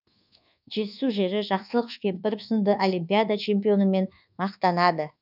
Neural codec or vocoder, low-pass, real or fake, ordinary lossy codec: codec, 24 kHz, 1.2 kbps, DualCodec; 5.4 kHz; fake; none